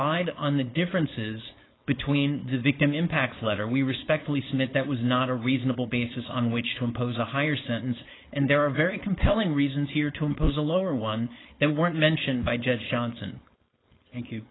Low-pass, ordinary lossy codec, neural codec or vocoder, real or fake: 7.2 kHz; AAC, 16 kbps; codec, 16 kHz, 4.8 kbps, FACodec; fake